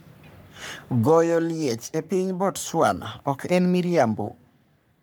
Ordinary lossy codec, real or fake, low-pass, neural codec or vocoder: none; fake; none; codec, 44.1 kHz, 3.4 kbps, Pupu-Codec